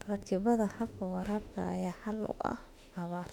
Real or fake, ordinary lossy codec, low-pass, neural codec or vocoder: fake; none; 19.8 kHz; autoencoder, 48 kHz, 32 numbers a frame, DAC-VAE, trained on Japanese speech